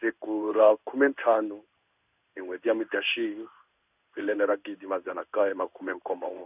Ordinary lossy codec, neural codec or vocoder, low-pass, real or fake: none; codec, 16 kHz in and 24 kHz out, 1 kbps, XY-Tokenizer; 3.6 kHz; fake